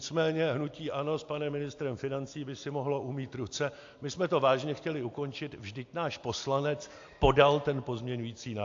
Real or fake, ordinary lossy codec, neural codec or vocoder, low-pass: real; AAC, 48 kbps; none; 7.2 kHz